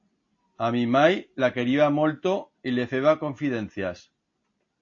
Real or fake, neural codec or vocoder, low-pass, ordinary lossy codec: real; none; 7.2 kHz; AAC, 48 kbps